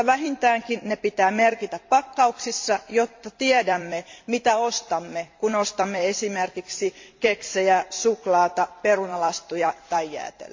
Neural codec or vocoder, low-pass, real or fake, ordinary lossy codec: vocoder, 44.1 kHz, 128 mel bands every 256 samples, BigVGAN v2; 7.2 kHz; fake; MP3, 48 kbps